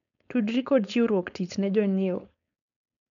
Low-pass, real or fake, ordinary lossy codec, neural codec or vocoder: 7.2 kHz; fake; none; codec, 16 kHz, 4.8 kbps, FACodec